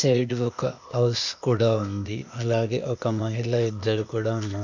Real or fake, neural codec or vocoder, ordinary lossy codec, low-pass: fake; codec, 16 kHz, 0.8 kbps, ZipCodec; none; 7.2 kHz